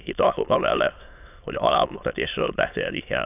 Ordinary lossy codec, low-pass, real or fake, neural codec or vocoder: none; 3.6 kHz; fake; autoencoder, 22.05 kHz, a latent of 192 numbers a frame, VITS, trained on many speakers